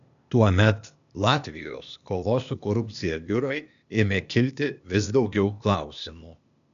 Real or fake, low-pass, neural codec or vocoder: fake; 7.2 kHz; codec, 16 kHz, 0.8 kbps, ZipCodec